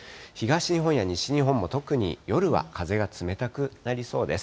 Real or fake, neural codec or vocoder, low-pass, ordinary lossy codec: real; none; none; none